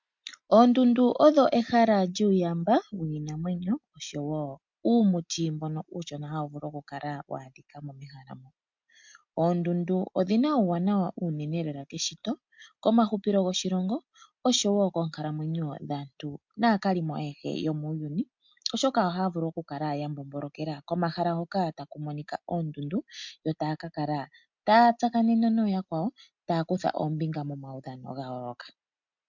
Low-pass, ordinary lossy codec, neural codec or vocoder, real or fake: 7.2 kHz; MP3, 64 kbps; none; real